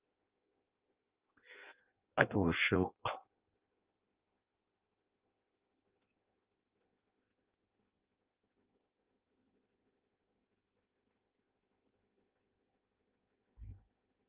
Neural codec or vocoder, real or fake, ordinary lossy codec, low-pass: codec, 16 kHz in and 24 kHz out, 0.6 kbps, FireRedTTS-2 codec; fake; Opus, 24 kbps; 3.6 kHz